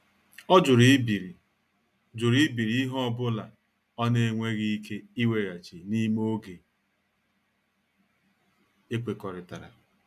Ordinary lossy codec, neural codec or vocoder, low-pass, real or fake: none; none; 14.4 kHz; real